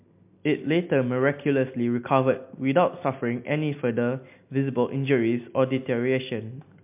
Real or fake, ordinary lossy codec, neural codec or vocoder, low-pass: real; MP3, 32 kbps; none; 3.6 kHz